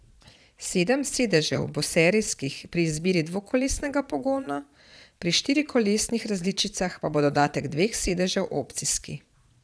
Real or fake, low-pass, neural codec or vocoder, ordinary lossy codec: fake; none; vocoder, 22.05 kHz, 80 mel bands, Vocos; none